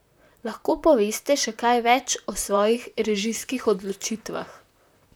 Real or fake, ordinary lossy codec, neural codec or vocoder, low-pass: fake; none; vocoder, 44.1 kHz, 128 mel bands, Pupu-Vocoder; none